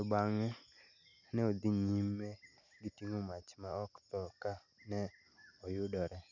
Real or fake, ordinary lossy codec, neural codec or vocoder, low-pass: real; none; none; 7.2 kHz